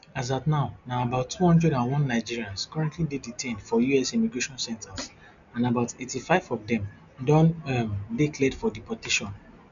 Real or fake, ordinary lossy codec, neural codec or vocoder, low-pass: real; none; none; 7.2 kHz